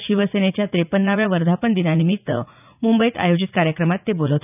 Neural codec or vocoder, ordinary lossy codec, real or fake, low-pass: vocoder, 44.1 kHz, 80 mel bands, Vocos; none; fake; 3.6 kHz